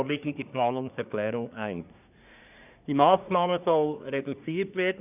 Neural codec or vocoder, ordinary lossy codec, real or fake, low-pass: codec, 24 kHz, 1 kbps, SNAC; none; fake; 3.6 kHz